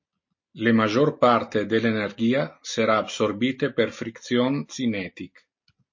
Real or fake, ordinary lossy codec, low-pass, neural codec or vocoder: real; MP3, 32 kbps; 7.2 kHz; none